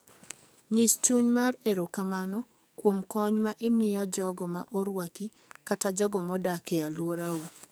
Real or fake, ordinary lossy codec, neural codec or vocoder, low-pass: fake; none; codec, 44.1 kHz, 2.6 kbps, SNAC; none